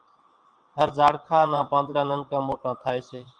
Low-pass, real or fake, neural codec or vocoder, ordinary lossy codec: 9.9 kHz; fake; vocoder, 22.05 kHz, 80 mel bands, WaveNeXt; Opus, 32 kbps